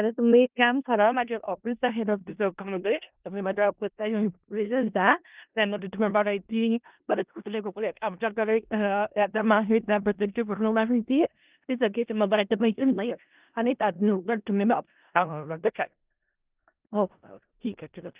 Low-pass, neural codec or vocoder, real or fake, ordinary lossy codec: 3.6 kHz; codec, 16 kHz in and 24 kHz out, 0.4 kbps, LongCat-Audio-Codec, four codebook decoder; fake; Opus, 32 kbps